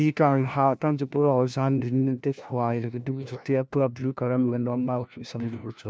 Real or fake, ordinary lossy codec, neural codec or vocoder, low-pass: fake; none; codec, 16 kHz, 1 kbps, FunCodec, trained on LibriTTS, 50 frames a second; none